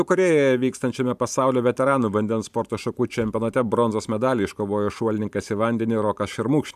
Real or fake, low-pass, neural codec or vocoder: fake; 14.4 kHz; vocoder, 44.1 kHz, 128 mel bands every 512 samples, BigVGAN v2